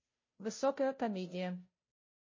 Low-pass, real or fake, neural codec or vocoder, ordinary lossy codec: 7.2 kHz; fake; codec, 16 kHz, 0.5 kbps, FunCodec, trained on Chinese and English, 25 frames a second; MP3, 32 kbps